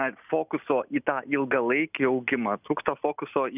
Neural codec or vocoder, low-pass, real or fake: none; 3.6 kHz; real